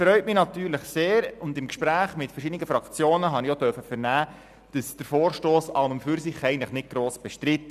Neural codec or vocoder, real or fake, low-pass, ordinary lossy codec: none; real; 14.4 kHz; none